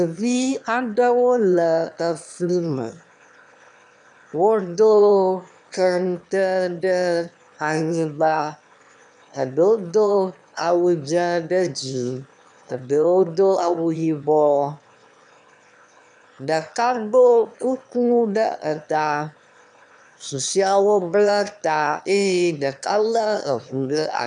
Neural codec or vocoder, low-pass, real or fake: autoencoder, 22.05 kHz, a latent of 192 numbers a frame, VITS, trained on one speaker; 9.9 kHz; fake